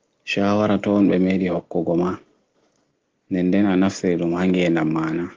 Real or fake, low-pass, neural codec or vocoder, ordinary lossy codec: real; 7.2 kHz; none; Opus, 16 kbps